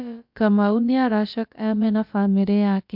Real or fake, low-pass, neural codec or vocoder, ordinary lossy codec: fake; 5.4 kHz; codec, 16 kHz, about 1 kbps, DyCAST, with the encoder's durations; MP3, 48 kbps